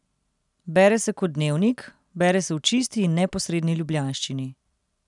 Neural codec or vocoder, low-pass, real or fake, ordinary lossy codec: none; 10.8 kHz; real; none